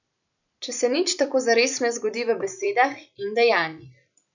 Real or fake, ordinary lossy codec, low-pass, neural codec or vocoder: fake; none; 7.2 kHz; vocoder, 44.1 kHz, 128 mel bands every 256 samples, BigVGAN v2